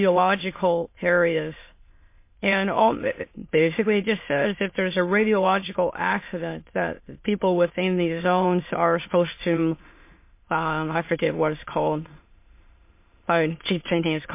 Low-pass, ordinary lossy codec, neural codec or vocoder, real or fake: 3.6 kHz; MP3, 24 kbps; autoencoder, 22.05 kHz, a latent of 192 numbers a frame, VITS, trained on many speakers; fake